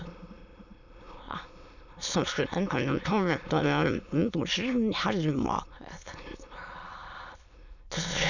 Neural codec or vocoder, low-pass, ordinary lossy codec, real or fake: autoencoder, 22.05 kHz, a latent of 192 numbers a frame, VITS, trained on many speakers; 7.2 kHz; none; fake